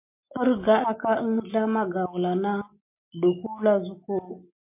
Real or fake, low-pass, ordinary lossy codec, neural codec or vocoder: real; 3.6 kHz; MP3, 24 kbps; none